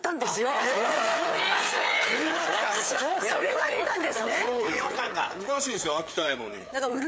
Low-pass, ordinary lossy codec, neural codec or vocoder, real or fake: none; none; codec, 16 kHz, 4 kbps, FreqCodec, larger model; fake